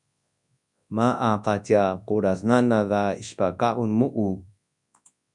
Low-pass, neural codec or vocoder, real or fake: 10.8 kHz; codec, 24 kHz, 0.9 kbps, WavTokenizer, large speech release; fake